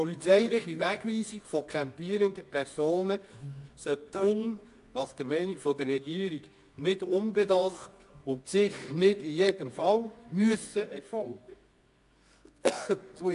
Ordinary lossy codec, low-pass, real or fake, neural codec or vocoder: AAC, 64 kbps; 10.8 kHz; fake; codec, 24 kHz, 0.9 kbps, WavTokenizer, medium music audio release